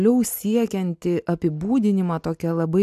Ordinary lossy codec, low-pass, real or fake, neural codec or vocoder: AAC, 96 kbps; 14.4 kHz; real; none